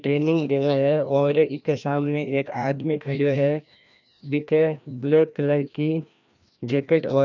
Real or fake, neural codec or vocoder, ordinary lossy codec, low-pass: fake; codec, 16 kHz, 1 kbps, FreqCodec, larger model; none; 7.2 kHz